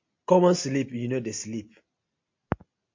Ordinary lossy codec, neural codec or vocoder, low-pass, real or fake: MP3, 48 kbps; none; 7.2 kHz; real